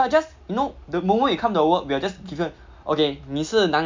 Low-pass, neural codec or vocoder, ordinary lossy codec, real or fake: 7.2 kHz; none; MP3, 64 kbps; real